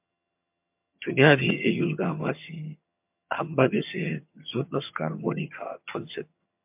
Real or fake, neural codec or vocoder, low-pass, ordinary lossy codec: fake; vocoder, 22.05 kHz, 80 mel bands, HiFi-GAN; 3.6 kHz; MP3, 32 kbps